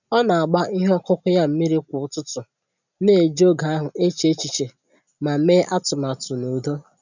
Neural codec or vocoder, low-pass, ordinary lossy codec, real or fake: none; 7.2 kHz; none; real